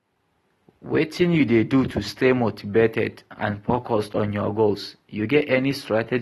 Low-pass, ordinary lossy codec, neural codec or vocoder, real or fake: 19.8 kHz; AAC, 32 kbps; none; real